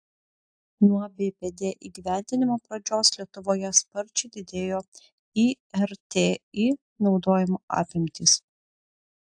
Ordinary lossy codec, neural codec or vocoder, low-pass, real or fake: AAC, 64 kbps; none; 9.9 kHz; real